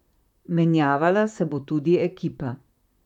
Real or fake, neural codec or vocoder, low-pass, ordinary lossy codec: fake; vocoder, 44.1 kHz, 128 mel bands, Pupu-Vocoder; 19.8 kHz; none